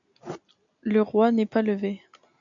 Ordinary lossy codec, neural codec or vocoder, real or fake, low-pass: AAC, 64 kbps; none; real; 7.2 kHz